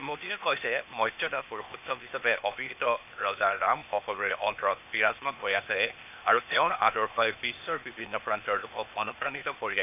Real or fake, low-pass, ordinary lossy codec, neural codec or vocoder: fake; 3.6 kHz; none; codec, 16 kHz, 0.8 kbps, ZipCodec